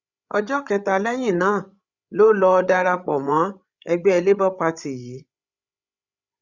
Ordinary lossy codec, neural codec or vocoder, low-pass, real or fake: Opus, 64 kbps; codec, 16 kHz, 16 kbps, FreqCodec, larger model; 7.2 kHz; fake